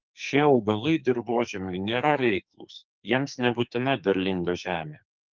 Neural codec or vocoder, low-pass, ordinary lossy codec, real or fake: codec, 44.1 kHz, 2.6 kbps, SNAC; 7.2 kHz; Opus, 32 kbps; fake